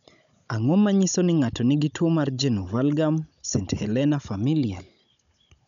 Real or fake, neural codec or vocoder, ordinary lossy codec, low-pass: fake; codec, 16 kHz, 16 kbps, FunCodec, trained on Chinese and English, 50 frames a second; none; 7.2 kHz